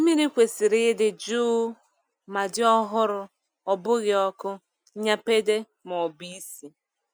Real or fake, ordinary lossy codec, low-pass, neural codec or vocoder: real; none; none; none